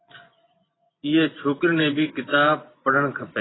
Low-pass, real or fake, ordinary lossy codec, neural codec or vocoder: 7.2 kHz; fake; AAC, 16 kbps; vocoder, 24 kHz, 100 mel bands, Vocos